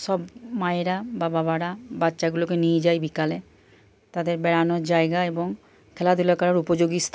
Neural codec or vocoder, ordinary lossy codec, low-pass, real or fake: none; none; none; real